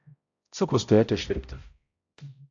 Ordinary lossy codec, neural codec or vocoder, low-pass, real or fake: AAC, 48 kbps; codec, 16 kHz, 0.5 kbps, X-Codec, HuBERT features, trained on balanced general audio; 7.2 kHz; fake